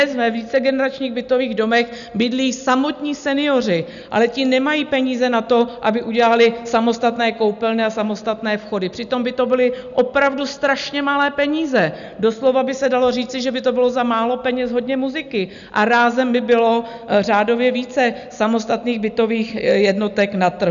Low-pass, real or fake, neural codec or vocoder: 7.2 kHz; real; none